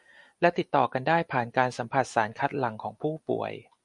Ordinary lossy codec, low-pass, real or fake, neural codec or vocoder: MP3, 96 kbps; 10.8 kHz; real; none